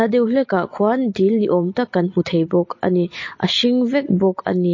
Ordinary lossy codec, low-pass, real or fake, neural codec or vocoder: MP3, 32 kbps; 7.2 kHz; real; none